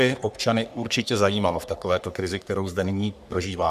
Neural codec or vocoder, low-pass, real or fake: codec, 44.1 kHz, 3.4 kbps, Pupu-Codec; 14.4 kHz; fake